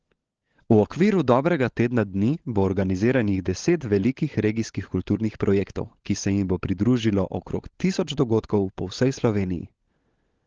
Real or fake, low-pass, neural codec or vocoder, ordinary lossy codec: fake; 7.2 kHz; codec, 16 kHz, 16 kbps, FunCodec, trained on LibriTTS, 50 frames a second; Opus, 16 kbps